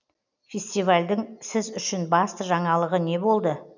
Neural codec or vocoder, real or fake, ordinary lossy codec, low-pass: none; real; none; 7.2 kHz